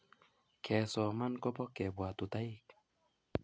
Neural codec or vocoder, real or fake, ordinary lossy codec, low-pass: none; real; none; none